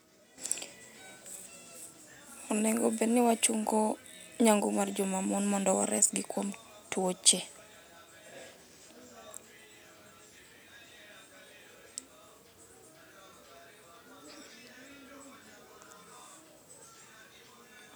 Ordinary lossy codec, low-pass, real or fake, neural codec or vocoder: none; none; real; none